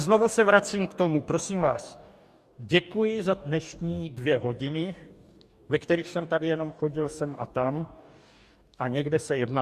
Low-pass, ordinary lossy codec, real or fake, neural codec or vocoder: 14.4 kHz; Opus, 64 kbps; fake; codec, 44.1 kHz, 2.6 kbps, DAC